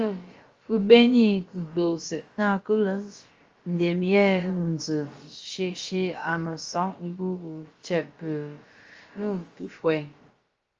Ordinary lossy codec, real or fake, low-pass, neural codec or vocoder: Opus, 32 kbps; fake; 7.2 kHz; codec, 16 kHz, about 1 kbps, DyCAST, with the encoder's durations